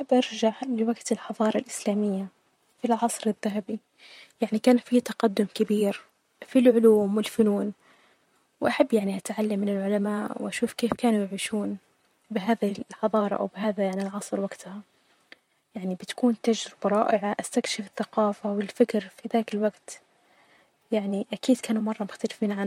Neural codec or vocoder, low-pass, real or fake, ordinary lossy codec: vocoder, 44.1 kHz, 128 mel bands, Pupu-Vocoder; 19.8 kHz; fake; MP3, 64 kbps